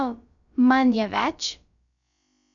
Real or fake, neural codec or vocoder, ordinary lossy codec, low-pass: fake; codec, 16 kHz, about 1 kbps, DyCAST, with the encoder's durations; none; 7.2 kHz